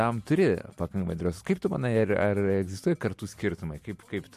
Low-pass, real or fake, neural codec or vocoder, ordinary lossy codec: 14.4 kHz; real; none; MP3, 64 kbps